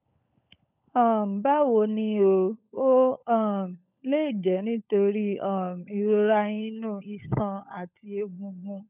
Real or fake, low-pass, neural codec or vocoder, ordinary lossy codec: fake; 3.6 kHz; codec, 16 kHz, 16 kbps, FunCodec, trained on LibriTTS, 50 frames a second; none